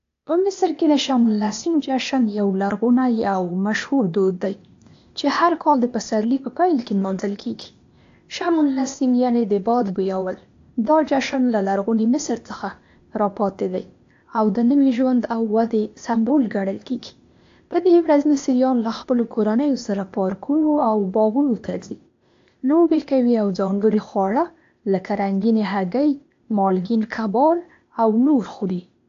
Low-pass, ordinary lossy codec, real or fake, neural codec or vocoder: 7.2 kHz; AAC, 48 kbps; fake; codec, 16 kHz, 0.8 kbps, ZipCodec